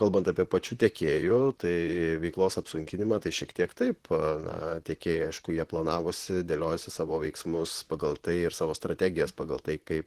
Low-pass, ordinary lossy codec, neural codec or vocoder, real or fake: 14.4 kHz; Opus, 16 kbps; vocoder, 44.1 kHz, 128 mel bands, Pupu-Vocoder; fake